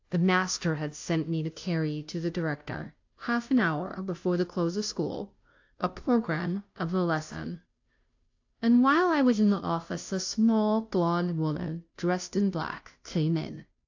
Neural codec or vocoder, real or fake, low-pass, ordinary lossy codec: codec, 16 kHz, 0.5 kbps, FunCodec, trained on Chinese and English, 25 frames a second; fake; 7.2 kHz; AAC, 48 kbps